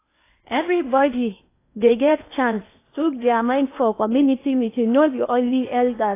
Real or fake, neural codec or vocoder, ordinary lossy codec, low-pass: fake; codec, 16 kHz in and 24 kHz out, 0.8 kbps, FocalCodec, streaming, 65536 codes; AAC, 24 kbps; 3.6 kHz